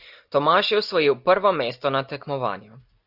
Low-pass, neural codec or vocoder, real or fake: 5.4 kHz; none; real